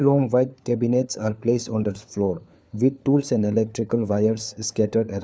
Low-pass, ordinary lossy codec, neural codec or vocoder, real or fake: none; none; codec, 16 kHz, 4 kbps, FunCodec, trained on LibriTTS, 50 frames a second; fake